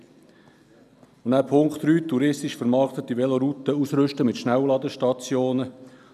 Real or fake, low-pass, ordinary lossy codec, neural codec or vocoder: real; 14.4 kHz; none; none